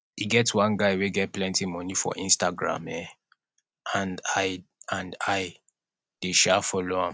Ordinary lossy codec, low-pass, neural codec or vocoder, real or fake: none; none; none; real